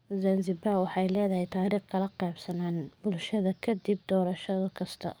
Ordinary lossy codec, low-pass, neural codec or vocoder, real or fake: none; none; codec, 44.1 kHz, 7.8 kbps, DAC; fake